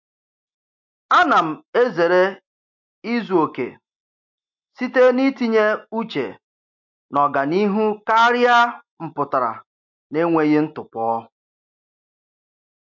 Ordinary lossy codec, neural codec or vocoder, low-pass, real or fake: MP3, 48 kbps; none; 7.2 kHz; real